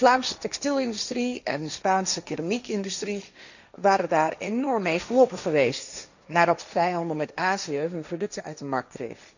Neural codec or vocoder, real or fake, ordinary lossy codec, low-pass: codec, 16 kHz, 1.1 kbps, Voila-Tokenizer; fake; none; 7.2 kHz